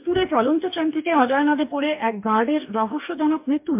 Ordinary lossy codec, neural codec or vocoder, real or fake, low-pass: MP3, 32 kbps; codec, 44.1 kHz, 2.6 kbps, DAC; fake; 3.6 kHz